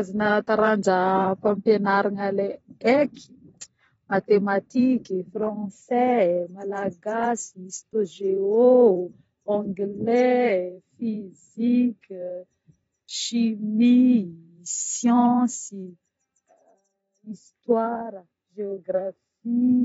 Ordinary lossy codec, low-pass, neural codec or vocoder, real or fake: AAC, 24 kbps; 19.8 kHz; vocoder, 44.1 kHz, 128 mel bands every 512 samples, BigVGAN v2; fake